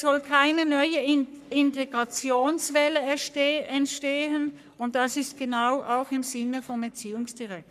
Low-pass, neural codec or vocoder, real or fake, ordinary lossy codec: 14.4 kHz; codec, 44.1 kHz, 3.4 kbps, Pupu-Codec; fake; none